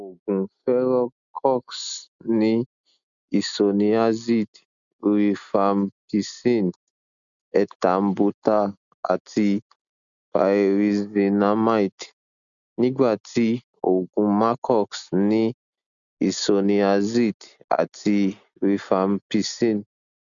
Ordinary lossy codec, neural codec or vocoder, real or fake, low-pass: none; none; real; 7.2 kHz